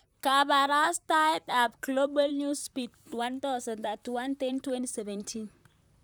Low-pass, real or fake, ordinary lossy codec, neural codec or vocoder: none; fake; none; vocoder, 44.1 kHz, 128 mel bands, Pupu-Vocoder